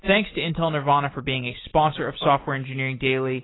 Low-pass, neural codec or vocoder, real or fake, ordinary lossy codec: 7.2 kHz; none; real; AAC, 16 kbps